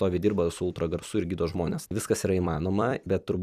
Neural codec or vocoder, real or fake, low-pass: vocoder, 44.1 kHz, 128 mel bands every 256 samples, BigVGAN v2; fake; 14.4 kHz